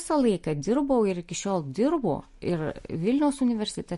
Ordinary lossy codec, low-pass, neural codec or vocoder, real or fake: MP3, 48 kbps; 14.4 kHz; none; real